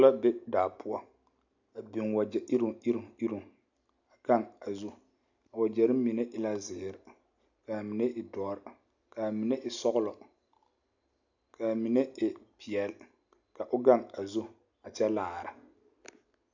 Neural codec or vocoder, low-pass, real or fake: none; 7.2 kHz; real